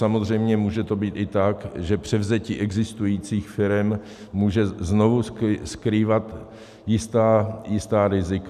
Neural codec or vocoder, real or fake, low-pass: none; real; 14.4 kHz